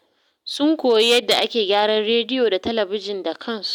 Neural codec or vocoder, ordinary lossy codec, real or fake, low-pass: none; none; real; 19.8 kHz